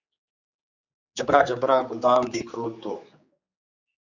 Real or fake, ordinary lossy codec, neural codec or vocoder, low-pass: fake; Opus, 64 kbps; codec, 16 kHz, 4 kbps, X-Codec, HuBERT features, trained on general audio; 7.2 kHz